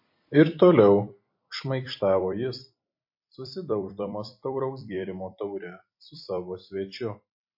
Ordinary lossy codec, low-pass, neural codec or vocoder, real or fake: MP3, 32 kbps; 5.4 kHz; vocoder, 44.1 kHz, 128 mel bands every 256 samples, BigVGAN v2; fake